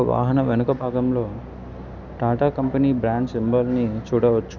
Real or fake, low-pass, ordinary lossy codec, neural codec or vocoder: real; 7.2 kHz; none; none